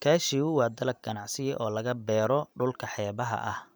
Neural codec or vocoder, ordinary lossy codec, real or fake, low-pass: vocoder, 44.1 kHz, 128 mel bands every 512 samples, BigVGAN v2; none; fake; none